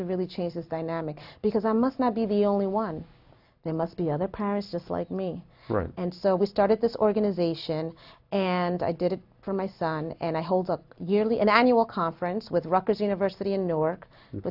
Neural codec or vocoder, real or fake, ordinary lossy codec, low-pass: none; real; AAC, 48 kbps; 5.4 kHz